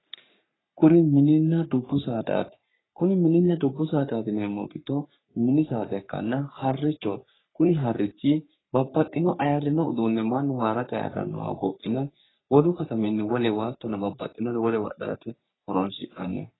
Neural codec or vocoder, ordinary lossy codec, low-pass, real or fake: codec, 44.1 kHz, 3.4 kbps, Pupu-Codec; AAC, 16 kbps; 7.2 kHz; fake